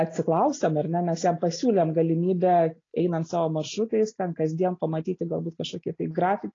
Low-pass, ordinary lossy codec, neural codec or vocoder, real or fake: 7.2 kHz; AAC, 32 kbps; none; real